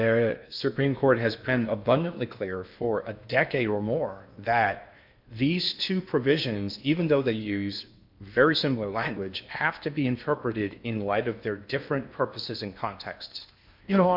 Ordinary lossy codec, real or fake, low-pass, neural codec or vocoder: MP3, 48 kbps; fake; 5.4 kHz; codec, 16 kHz in and 24 kHz out, 0.8 kbps, FocalCodec, streaming, 65536 codes